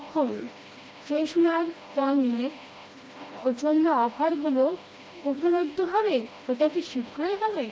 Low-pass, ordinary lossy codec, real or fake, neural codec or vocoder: none; none; fake; codec, 16 kHz, 1 kbps, FreqCodec, smaller model